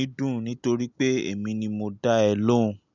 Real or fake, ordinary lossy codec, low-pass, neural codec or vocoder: real; none; 7.2 kHz; none